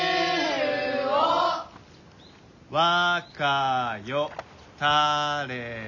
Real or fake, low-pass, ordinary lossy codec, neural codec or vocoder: real; 7.2 kHz; none; none